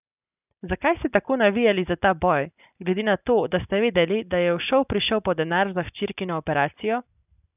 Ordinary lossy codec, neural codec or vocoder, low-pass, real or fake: none; none; 3.6 kHz; real